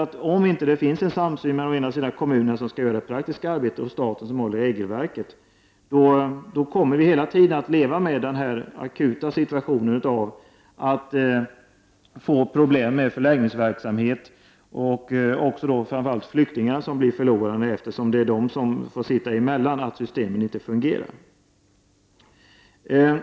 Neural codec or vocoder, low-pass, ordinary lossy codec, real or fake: none; none; none; real